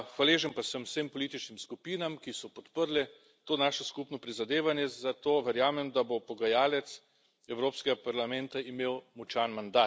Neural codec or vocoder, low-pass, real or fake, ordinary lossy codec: none; none; real; none